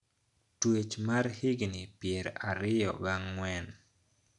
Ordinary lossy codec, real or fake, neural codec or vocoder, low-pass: none; real; none; 10.8 kHz